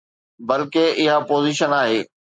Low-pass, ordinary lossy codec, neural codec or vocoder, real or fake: 9.9 kHz; MP3, 64 kbps; none; real